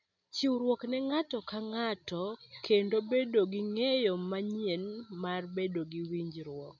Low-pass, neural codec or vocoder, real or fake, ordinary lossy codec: 7.2 kHz; none; real; none